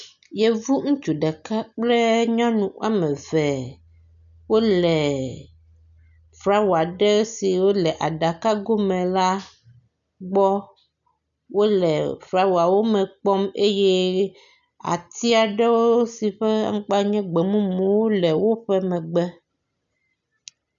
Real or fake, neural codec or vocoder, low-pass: real; none; 7.2 kHz